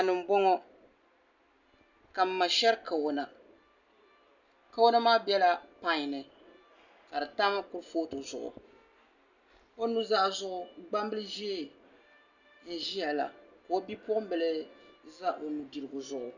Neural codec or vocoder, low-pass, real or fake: none; 7.2 kHz; real